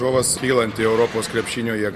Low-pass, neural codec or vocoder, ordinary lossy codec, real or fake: 14.4 kHz; none; MP3, 64 kbps; real